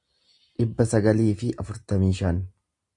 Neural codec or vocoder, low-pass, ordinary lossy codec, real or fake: none; 10.8 kHz; AAC, 64 kbps; real